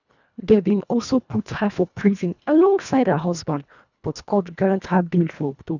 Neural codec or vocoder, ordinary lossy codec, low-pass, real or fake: codec, 24 kHz, 1.5 kbps, HILCodec; none; 7.2 kHz; fake